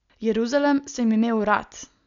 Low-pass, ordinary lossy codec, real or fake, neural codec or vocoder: 7.2 kHz; none; real; none